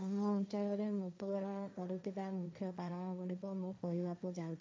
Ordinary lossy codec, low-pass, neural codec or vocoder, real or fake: none; none; codec, 16 kHz, 1.1 kbps, Voila-Tokenizer; fake